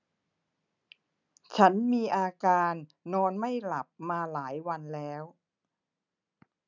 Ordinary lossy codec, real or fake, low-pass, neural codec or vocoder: none; real; 7.2 kHz; none